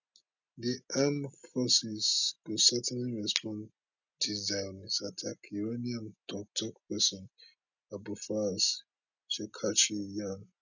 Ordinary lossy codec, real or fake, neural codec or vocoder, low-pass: none; real; none; 7.2 kHz